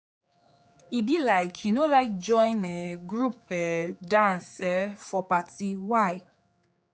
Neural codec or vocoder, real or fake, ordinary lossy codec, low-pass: codec, 16 kHz, 4 kbps, X-Codec, HuBERT features, trained on general audio; fake; none; none